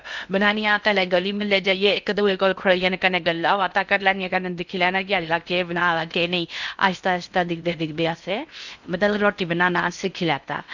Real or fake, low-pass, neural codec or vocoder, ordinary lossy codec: fake; 7.2 kHz; codec, 16 kHz in and 24 kHz out, 0.6 kbps, FocalCodec, streaming, 2048 codes; none